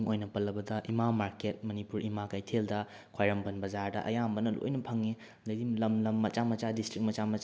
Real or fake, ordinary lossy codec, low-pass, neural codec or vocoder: real; none; none; none